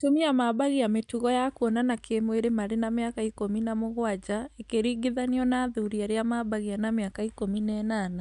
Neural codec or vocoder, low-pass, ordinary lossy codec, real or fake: none; 10.8 kHz; none; real